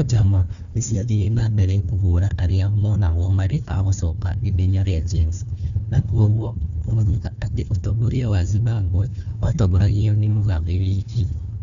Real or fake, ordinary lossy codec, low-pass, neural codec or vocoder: fake; none; 7.2 kHz; codec, 16 kHz, 1 kbps, FunCodec, trained on Chinese and English, 50 frames a second